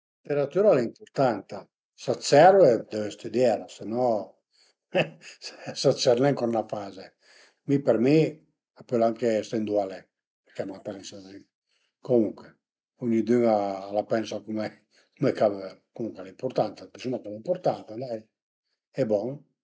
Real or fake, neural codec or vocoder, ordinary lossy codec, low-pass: real; none; none; none